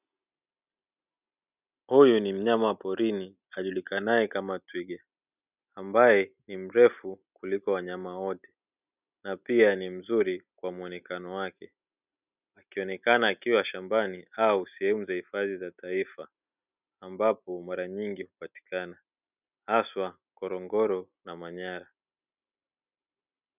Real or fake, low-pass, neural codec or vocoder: real; 3.6 kHz; none